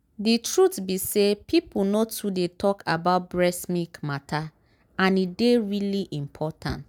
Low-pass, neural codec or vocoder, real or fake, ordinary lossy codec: none; none; real; none